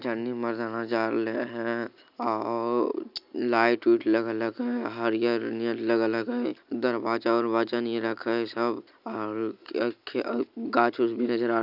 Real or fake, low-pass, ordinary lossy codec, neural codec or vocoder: real; 5.4 kHz; none; none